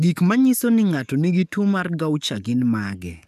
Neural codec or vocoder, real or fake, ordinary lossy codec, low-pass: codec, 44.1 kHz, 7.8 kbps, DAC; fake; none; 14.4 kHz